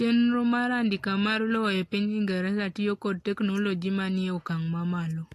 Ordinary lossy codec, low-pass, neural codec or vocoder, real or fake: AAC, 48 kbps; 14.4 kHz; none; real